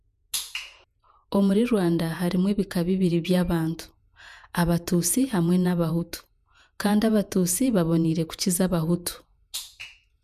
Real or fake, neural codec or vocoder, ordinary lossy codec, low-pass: fake; vocoder, 48 kHz, 128 mel bands, Vocos; none; 14.4 kHz